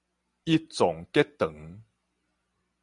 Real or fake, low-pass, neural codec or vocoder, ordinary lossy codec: real; 10.8 kHz; none; MP3, 96 kbps